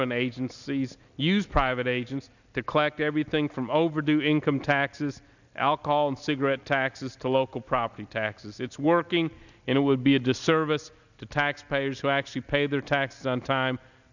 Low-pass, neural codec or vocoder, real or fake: 7.2 kHz; none; real